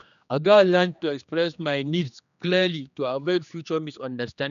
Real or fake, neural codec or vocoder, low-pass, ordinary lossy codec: fake; codec, 16 kHz, 2 kbps, X-Codec, HuBERT features, trained on general audio; 7.2 kHz; none